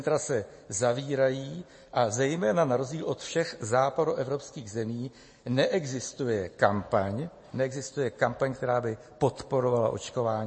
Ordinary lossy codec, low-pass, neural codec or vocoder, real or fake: MP3, 32 kbps; 9.9 kHz; none; real